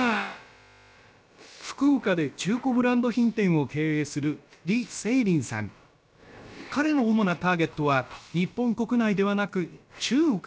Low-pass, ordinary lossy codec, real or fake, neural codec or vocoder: none; none; fake; codec, 16 kHz, about 1 kbps, DyCAST, with the encoder's durations